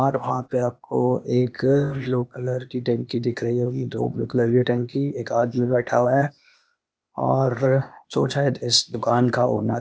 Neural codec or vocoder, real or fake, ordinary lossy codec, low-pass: codec, 16 kHz, 0.8 kbps, ZipCodec; fake; none; none